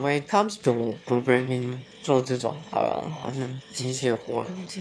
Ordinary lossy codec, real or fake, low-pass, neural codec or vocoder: none; fake; none; autoencoder, 22.05 kHz, a latent of 192 numbers a frame, VITS, trained on one speaker